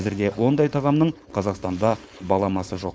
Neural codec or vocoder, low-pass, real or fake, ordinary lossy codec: codec, 16 kHz, 4.8 kbps, FACodec; none; fake; none